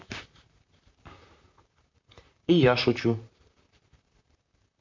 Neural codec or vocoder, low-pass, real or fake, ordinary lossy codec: vocoder, 44.1 kHz, 128 mel bands, Pupu-Vocoder; 7.2 kHz; fake; MP3, 48 kbps